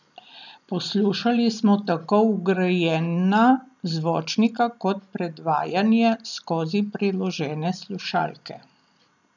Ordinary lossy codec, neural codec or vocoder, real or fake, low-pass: none; none; real; 7.2 kHz